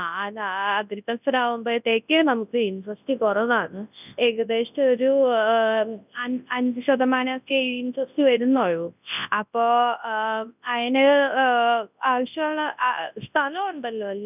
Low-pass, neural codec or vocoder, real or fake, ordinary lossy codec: 3.6 kHz; codec, 24 kHz, 0.9 kbps, WavTokenizer, large speech release; fake; none